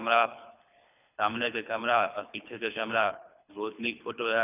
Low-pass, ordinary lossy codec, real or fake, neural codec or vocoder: 3.6 kHz; none; fake; codec, 24 kHz, 3 kbps, HILCodec